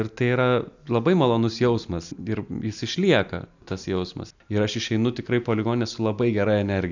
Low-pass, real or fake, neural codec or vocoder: 7.2 kHz; real; none